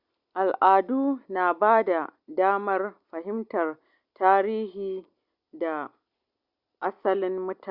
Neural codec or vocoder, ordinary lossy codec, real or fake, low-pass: none; Opus, 64 kbps; real; 5.4 kHz